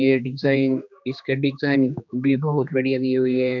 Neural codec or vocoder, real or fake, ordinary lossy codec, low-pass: codec, 16 kHz, 2 kbps, X-Codec, HuBERT features, trained on general audio; fake; none; 7.2 kHz